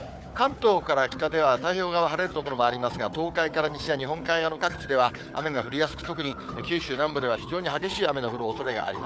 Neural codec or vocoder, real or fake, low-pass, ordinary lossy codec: codec, 16 kHz, 4 kbps, FunCodec, trained on Chinese and English, 50 frames a second; fake; none; none